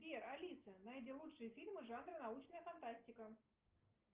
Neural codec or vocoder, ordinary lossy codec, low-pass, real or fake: none; Opus, 32 kbps; 3.6 kHz; real